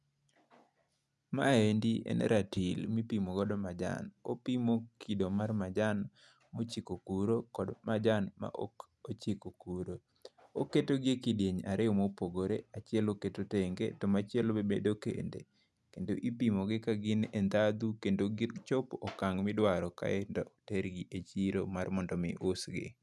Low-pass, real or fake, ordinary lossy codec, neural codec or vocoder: none; real; none; none